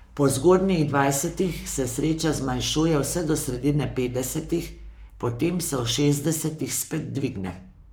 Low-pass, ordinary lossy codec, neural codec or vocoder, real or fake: none; none; codec, 44.1 kHz, 7.8 kbps, Pupu-Codec; fake